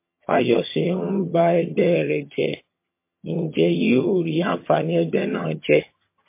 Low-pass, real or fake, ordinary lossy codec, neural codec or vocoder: 3.6 kHz; fake; MP3, 24 kbps; vocoder, 22.05 kHz, 80 mel bands, HiFi-GAN